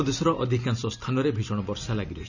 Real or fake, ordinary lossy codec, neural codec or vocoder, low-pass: real; none; none; 7.2 kHz